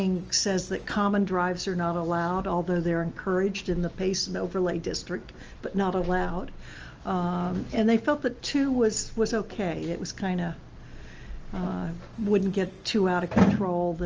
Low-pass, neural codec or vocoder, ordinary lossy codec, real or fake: 7.2 kHz; none; Opus, 24 kbps; real